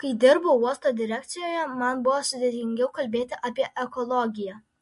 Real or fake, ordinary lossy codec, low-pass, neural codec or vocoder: real; MP3, 48 kbps; 14.4 kHz; none